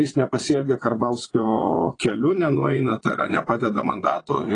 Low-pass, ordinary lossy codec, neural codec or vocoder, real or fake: 9.9 kHz; AAC, 32 kbps; vocoder, 22.05 kHz, 80 mel bands, WaveNeXt; fake